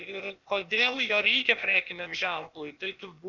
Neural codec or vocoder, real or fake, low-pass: codec, 16 kHz, 0.8 kbps, ZipCodec; fake; 7.2 kHz